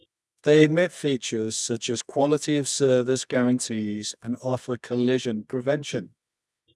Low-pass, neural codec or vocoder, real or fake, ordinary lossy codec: none; codec, 24 kHz, 0.9 kbps, WavTokenizer, medium music audio release; fake; none